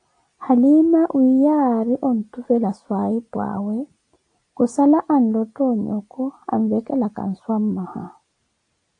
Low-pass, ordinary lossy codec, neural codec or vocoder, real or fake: 9.9 kHz; AAC, 48 kbps; none; real